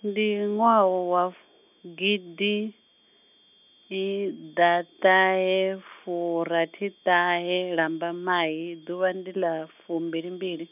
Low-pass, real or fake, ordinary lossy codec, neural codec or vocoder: 3.6 kHz; real; none; none